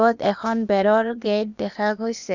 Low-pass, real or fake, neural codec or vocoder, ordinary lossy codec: 7.2 kHz; fake; codec, 16 kHz, 0.8 kbps, ZipCodec; none